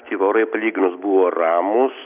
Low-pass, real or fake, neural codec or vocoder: 3.6 kHz; real; none